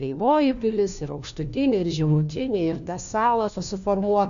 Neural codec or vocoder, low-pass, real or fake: codec, 16 kHz, 1 kbps, FunCodec, trained on LibriTTS, 50 frames a second; 7.2 kHz; fake